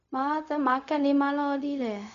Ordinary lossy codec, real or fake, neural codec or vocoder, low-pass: AAC, 96 kbps; fake; codec, 16 kHz, 0.4 kbps, LongCat-Audio-Codec; 7.2 kHz